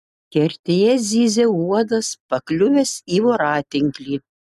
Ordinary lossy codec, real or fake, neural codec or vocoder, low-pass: MP3, 96 kbps; real; none; 14.4 kHz